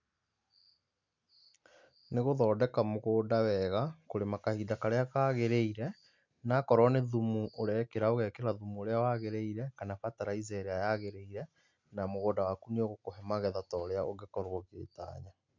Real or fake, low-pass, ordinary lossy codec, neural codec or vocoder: real; 7.2 kHz; MP3, 64 kbps; none